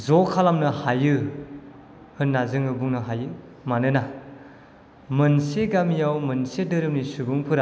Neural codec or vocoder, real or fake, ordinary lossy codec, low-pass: none; real; none; none